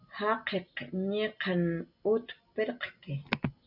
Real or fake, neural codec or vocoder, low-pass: real; none; 5.4 kHz